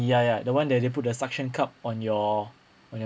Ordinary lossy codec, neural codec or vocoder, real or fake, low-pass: none; none; real; none